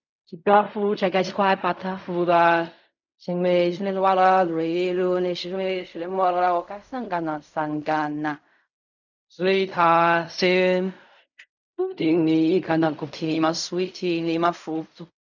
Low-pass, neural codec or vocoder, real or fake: 7.2 kHz; codec, 16 kHz in and 24 kHz out, 0.4 kbps, LongCat-Audio-Codec, fine tuned four codebook decoder; fake